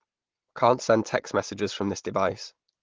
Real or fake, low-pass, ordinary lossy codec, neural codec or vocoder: real; 7.2 kHz; Opus, 16 kbps; none